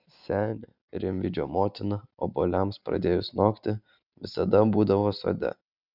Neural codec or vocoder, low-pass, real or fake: none; 5.4 kHz; real